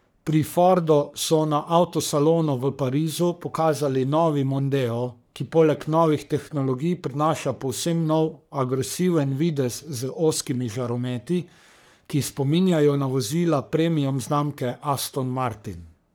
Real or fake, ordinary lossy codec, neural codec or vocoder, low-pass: fake; none; codec, 44.1 kHz, 3.4 kbps, Pupu-Codec; none